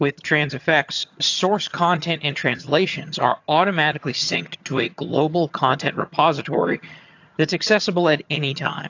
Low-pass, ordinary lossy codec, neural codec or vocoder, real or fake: 7.2 kHz; AAC, 48 kbps; vocoder, 22.05 kHz, 80 mel bands, HiFi-GAN; fake